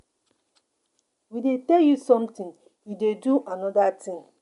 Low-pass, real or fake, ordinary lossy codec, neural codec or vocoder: 10.8 kHz; real; MP3, 64 kbps; none